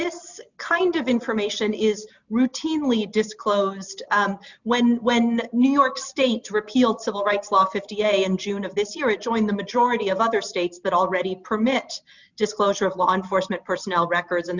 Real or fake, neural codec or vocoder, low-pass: real; none; 7.2 kHz